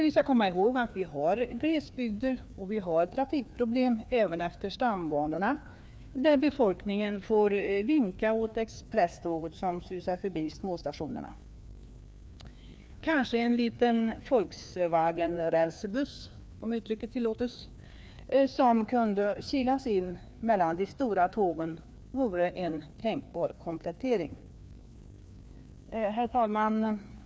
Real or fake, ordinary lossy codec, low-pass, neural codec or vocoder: fake; none; none; codec, 16 kHz, 2 kbps, FreqCodec, larger model